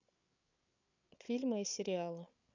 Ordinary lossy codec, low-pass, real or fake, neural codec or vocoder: none; 7.2 kHz; fake; codec, 16 kHz, 8 kbps, FreqCodec, larger model